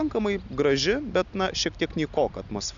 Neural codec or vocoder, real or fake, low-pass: none; real; 7.2 kHz